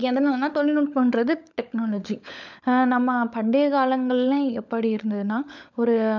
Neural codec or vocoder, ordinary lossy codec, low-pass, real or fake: codec, 16 kHz, 16 kbps, FunCodec, trained on LibriTTS, 50 frames a second; none; 7.2 kHz; fake